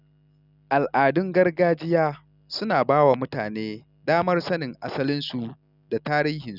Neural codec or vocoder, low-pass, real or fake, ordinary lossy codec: none; 5.4 kHz; real; none